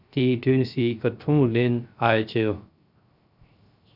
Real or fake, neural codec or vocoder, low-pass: fake; codec, 16 kHz, 0.3 kbps, FocalCodec; 5.4 kHz